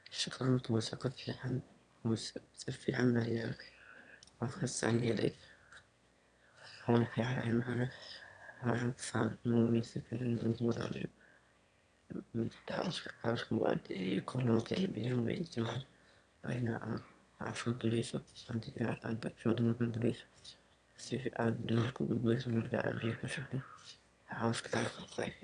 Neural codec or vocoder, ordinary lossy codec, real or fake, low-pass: autoencoder, 22.05 kHz, a latent of 192 numbers a frame, VITS, trained on one speaker; none; fake; 9.9 kHz